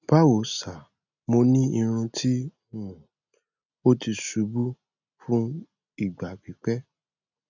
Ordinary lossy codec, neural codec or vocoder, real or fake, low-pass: none; none; real; 7.2 kHz